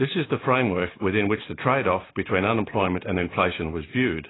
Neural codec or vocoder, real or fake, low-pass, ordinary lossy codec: none; real; 7.2 kHz; AAC, 16 kbps